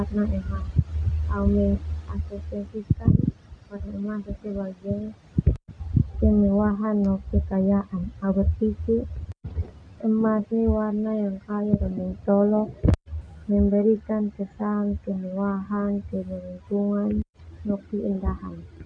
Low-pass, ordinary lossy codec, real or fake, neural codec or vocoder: 9.9 kHz; none; real; none